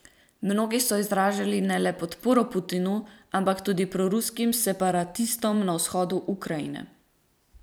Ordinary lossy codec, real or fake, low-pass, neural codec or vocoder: none; fake; none; vocoder, 44.1 kHz, 128 mel bands every 256 samples, BigVGAN v2